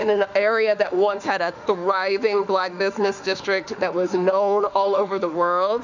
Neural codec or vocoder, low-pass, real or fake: autoencoder, 48 kHz, 32 numbers a frame, DAC-VAE, trained on Japanese speech; 7.2 kHz; fake